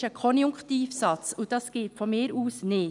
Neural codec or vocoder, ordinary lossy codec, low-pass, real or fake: none; none; 10.8 kHz; real